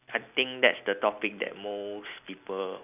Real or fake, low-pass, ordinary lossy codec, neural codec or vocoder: real; 3.6 kHz; none; none